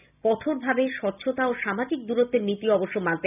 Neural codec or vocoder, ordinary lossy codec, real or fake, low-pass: none; none; real; 3.6 kHz